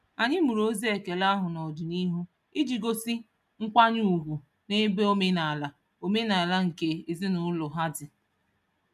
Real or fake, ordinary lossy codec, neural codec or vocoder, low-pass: real; none; none; 14.4 kHz